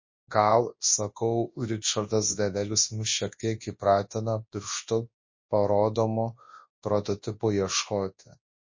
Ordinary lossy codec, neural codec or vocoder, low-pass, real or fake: MP3, 32 kbps; codec, 24 kHz, 0.9 kbps, WavTokenizer, large speech release; 7.2 kHz; fake